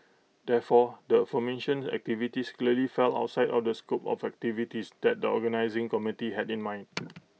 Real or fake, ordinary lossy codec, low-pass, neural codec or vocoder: real; none; none; none